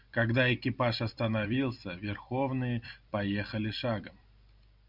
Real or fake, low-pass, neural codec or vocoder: fake; 5.4 kHz; vocoder, 44.1 kHz, 128 mel bands every 256 samples, BigVGAN v2